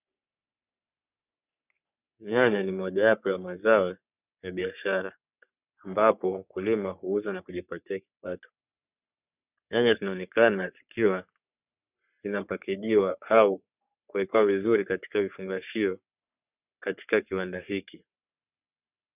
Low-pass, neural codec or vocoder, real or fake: 3.6 kHz; codec, 44.1 kHz, 3.4 kbps, Pupu-Codec; fake